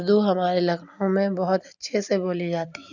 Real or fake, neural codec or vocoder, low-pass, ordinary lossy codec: real; none; 7.2 kHz; none